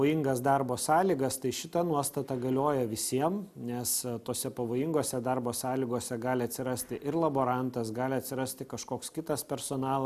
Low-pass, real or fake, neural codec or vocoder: 14.4 kHz; real; none